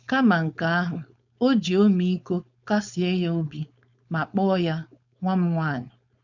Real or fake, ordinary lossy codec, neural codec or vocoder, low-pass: fake; none; codec, 16 kHz, 4.8 kbps, FACodec; 7.2 kHz